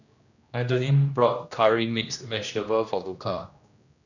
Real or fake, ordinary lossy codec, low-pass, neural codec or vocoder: fake; none; 7.2 kHz; codec, 16 kHz, 1 kbps, X-Codec, HuBERT features, trained on general audio